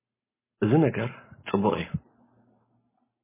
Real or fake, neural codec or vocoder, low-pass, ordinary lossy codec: real; none; 3.6 kHz; MP3, 16 kbps